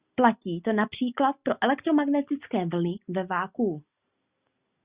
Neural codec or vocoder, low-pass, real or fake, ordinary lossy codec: none; 3.6 kHz; real; Opus, 64 kbps